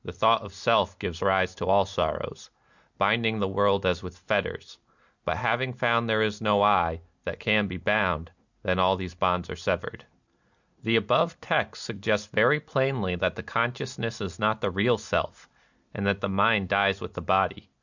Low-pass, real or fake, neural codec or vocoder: 7.2 kHz; real; none